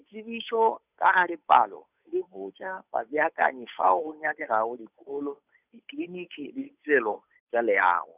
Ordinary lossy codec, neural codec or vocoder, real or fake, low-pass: none; codec, 16 kHz, 8 kbps, FunCodec, trained on Chinese and English, 25 frames a second; fake; 3.6 kHz